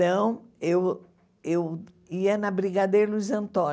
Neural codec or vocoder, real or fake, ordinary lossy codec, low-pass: none; real; none; none